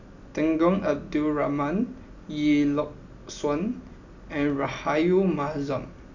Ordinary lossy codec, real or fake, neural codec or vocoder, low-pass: none; real; none; 7.2 kHz